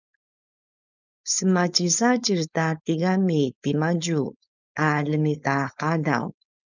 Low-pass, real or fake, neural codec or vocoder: 7.2 kHz; fake; codec, 16 kHz, 4.8 kbps, FACodec